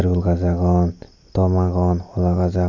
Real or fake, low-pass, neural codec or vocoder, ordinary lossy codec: real; 7.2 kHz; none; none